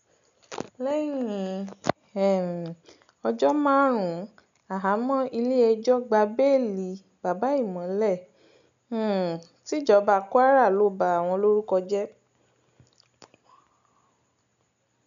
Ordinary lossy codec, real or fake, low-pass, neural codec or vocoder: none; real; 7.2 kHz; none